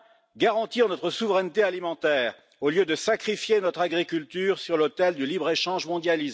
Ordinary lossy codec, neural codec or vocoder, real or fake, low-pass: none; none; real; none